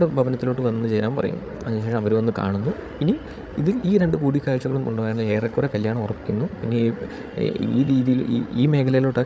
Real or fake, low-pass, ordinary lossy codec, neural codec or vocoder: fake; none; none; codec, 16 kHz, 8 kbps, FreqCodec, larger model